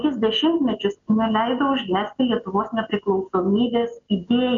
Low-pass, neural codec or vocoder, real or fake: 7.2 kHz; none; real